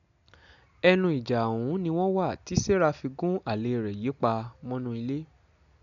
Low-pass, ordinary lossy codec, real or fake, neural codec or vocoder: 7.2 kHz; none; real; none